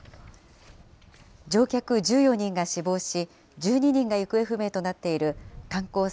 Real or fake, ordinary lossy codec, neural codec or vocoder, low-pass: real; none; none; none